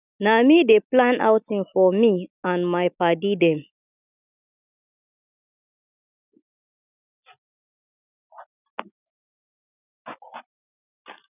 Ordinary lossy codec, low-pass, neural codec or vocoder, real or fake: none; 3.6 kHz; none; real